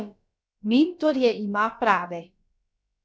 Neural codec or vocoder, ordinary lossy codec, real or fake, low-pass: codec, 16 kHz, about 1 kbps, DyCAST, with the encoder's durations; none; fake; none